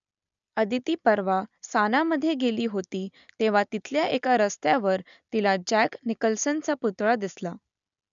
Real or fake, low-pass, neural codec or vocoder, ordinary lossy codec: real; 7.2 kHz; none; none